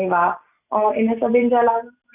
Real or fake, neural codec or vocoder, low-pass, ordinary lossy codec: real; none; 3.6 kHz; none